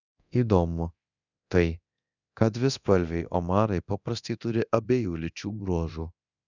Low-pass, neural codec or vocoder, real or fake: 7.2 kHz; codec, 24 kHz, 0.9 kbps, DualCodec; fake